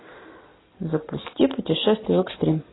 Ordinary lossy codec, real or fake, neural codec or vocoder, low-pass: AAC, 16 kbps; real; none; 7.2 kHz